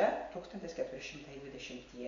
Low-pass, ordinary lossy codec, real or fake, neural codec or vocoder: 7.2 kHz; MP3, 64 kbps; real; none